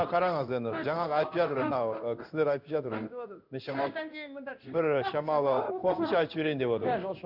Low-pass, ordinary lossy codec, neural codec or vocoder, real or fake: 5.4 kHz; none; codec, 16 kHz in and 24 kHz out, 1 kbps, XY-Tokenizer; fake